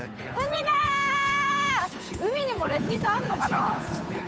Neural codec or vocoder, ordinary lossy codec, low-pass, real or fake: codec, 16 kHz, 8 kbps, FunCodec, trained on Chinese and English, 25 frames a second; none; none; fake